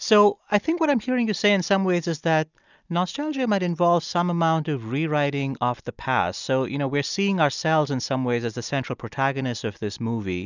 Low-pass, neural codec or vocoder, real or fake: 7.2 kHz; none; real